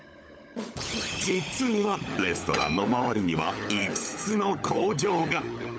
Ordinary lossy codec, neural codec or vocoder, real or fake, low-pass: none; codec, 16 kHz, 16 kbps, FunCodec, trained on LibriTTS, 50 frames a second; fake; none